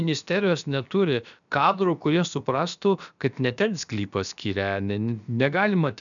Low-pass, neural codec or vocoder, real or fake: 7.2 kHz; codec, 16 kHz, about 1 kbps, DyCAST, with the encoder's durations; fake